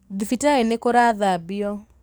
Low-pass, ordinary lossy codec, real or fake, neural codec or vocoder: none; none; fake; codec, 44.1 kHz, 7.8 kbps, DAC